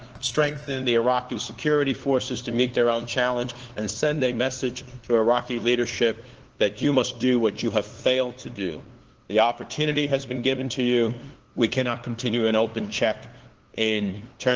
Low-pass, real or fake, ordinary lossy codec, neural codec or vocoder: 7.2 kHz; fake; Opus, 16 kbps; codec, 16 kHz, 2 kbps, FunCodec, trained on LibriTTS, 25 frames a second